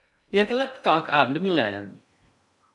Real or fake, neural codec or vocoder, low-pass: fake; codec, 16 kHz in and 24 kHz out, 0.6 kbps, FocalCodec, streaming, 2048 codes; 10.8 kHz